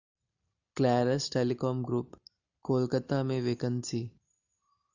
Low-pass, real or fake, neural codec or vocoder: 7.2 kHz; real; none